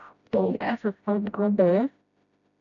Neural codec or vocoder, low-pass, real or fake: codec, 16 kHz, 0.5 kbps, FreqCodec, smaller model; 7.2 kHz; fake